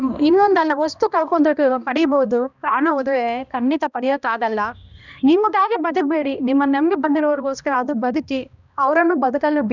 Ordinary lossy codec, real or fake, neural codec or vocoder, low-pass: none; fake; codec, 16 kHz, 1 kbps, X-Codec, HuBERT features, trained on balanced general audio; 7.2 kHz